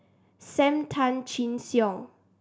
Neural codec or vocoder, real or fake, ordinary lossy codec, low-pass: none; real; none; none